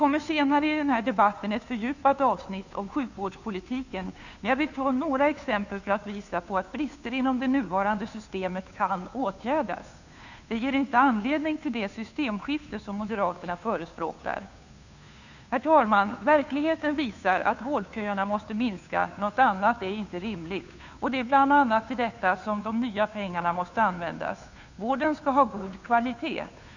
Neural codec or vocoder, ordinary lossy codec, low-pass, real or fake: codec, 16 kHz, 2 kbps, FunCodec, trained on Chinese and English, 25 frames a second; none; 7.2 kHz; fake